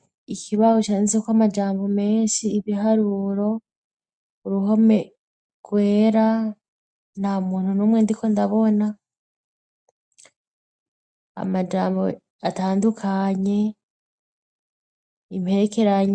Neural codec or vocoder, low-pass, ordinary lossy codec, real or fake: none; 9.9 kHz; MP3, 64 kbps; real